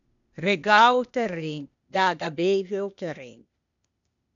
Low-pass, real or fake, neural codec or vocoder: 7.2 kHz; fake; codec, 16 kHz, 0.8 kbps, ZipCodec